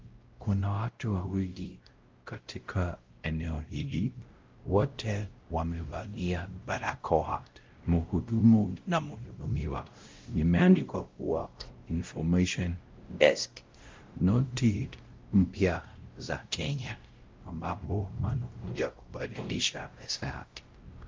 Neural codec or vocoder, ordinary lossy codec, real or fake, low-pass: codec, 16 kHz, 0.5 kbps, X-Codec, WavLM features, trained on Multilingual LibriSpeech; Opus, 32 kbps; fake; 7.2 kHz